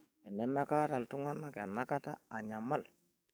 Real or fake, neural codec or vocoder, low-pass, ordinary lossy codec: fake; codec, 44.1 kHz, 7.8 kbps, DAC; none; none